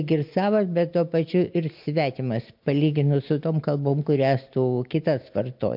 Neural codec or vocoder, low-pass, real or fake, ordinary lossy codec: none; 5.4 kHz; real; MP3, 48 kbps